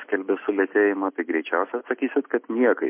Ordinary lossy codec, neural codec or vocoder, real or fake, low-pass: MP3, 24 kbps; none; real; 3.6 kHz